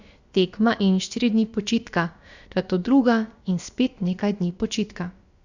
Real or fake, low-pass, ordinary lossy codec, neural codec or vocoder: fake; 7.2 kHz; Opus, 64 kbps; codec, 16 kHz, about 1 kbps, DyCAST, with the encoder's durations